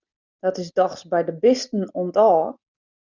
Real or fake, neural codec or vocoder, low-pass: real; none; 7.2 kHz